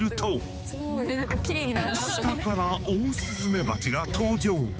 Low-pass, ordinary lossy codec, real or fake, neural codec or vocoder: none; none; fake; codec, 16 kHz, 4 kbps, X-Codec, HuBERT features, trained on balanced general audio